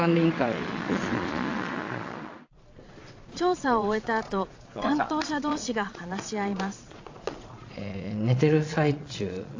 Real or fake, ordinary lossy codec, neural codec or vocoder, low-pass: fake; AAC, 48 kbps; vocoder, 22.05 kHz, 80 mel bands, Vocos; 7.2 kHz